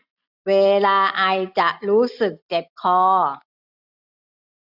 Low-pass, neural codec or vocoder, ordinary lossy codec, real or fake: 5.4 kHz; none; none; real